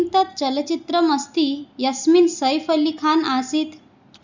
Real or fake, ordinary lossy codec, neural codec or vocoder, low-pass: real; Opus, 64 kbps; none; 7.2 kHz